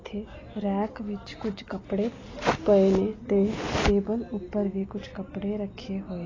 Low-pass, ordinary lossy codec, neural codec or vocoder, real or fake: 7.2 kHz; AAC, 32 kbps; none; real